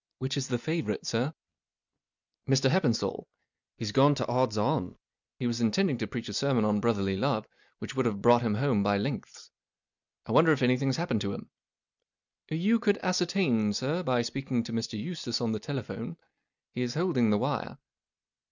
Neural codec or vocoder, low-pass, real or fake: none; 7.2 kHz; real